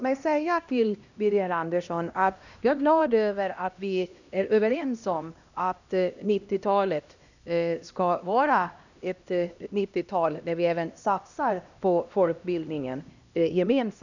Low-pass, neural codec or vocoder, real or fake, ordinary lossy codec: 7.2 kHz; codec, 16 kHz, 1 kbps, X-Codec, HuBERT features, trained on LibriSpeech; fake; none